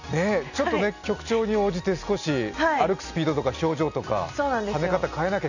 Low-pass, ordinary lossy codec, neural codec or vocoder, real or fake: 7.2 kHz; none; none; real